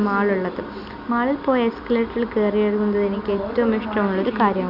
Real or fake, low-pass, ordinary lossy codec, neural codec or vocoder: real; 5.4 kHz; none; none